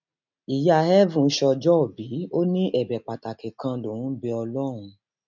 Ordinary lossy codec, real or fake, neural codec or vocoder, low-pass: none; real; none; 7.2 kHz